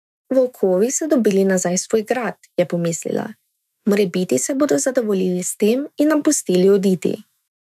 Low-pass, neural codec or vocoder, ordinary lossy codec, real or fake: 14.4 kHz; autoencoder, 48 kHz, 128 numbers a frame, DAC-VAE, trained on Japanese speech; none; fake